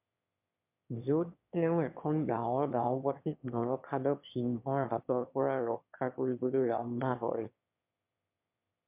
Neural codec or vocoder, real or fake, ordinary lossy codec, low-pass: autoencoder, 22.05 kHz, a latent of 192 numbers a frame, VITS, trained on one speaker; fake; MP3, 32 kbps; 3.6 kHz